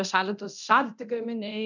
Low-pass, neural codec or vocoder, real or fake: 7.2 kHz; codec, 24 kHz, 0.9 kbps, DualCodec; fake